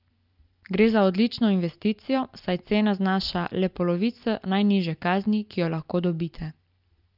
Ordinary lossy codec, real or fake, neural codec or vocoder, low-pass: Opus, 24 kbps; real; none; 5.4 kHz